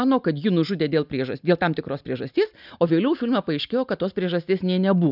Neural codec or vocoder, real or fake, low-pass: none; real; 5.4 kHz